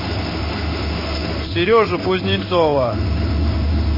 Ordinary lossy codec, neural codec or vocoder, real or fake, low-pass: MP3, 24 kbps; codec, 16 kHz in and 24 kHz out, 1 kbps, XY-Tokenizer; fake; 5.4 kHz